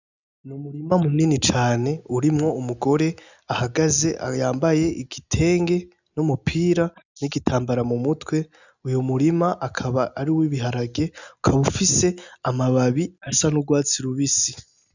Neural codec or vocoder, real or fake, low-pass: none; real; 7.2 kHz